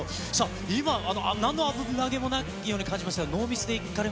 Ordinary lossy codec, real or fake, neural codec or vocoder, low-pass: none; real; none; none